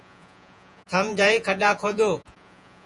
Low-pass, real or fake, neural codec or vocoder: 10.8 kHz; fake; vocoder, 48 kHz, 128 mel bands, Vocos